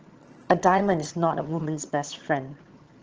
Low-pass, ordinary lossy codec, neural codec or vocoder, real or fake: 7.2 kHz; Opus, 16 kbps; vocoder, 22.05 kHz, 80 mel bands, HiFi-GAN; fake